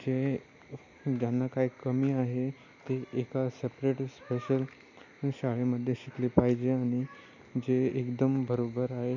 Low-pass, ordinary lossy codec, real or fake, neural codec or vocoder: 7.2 kHz; none; real; none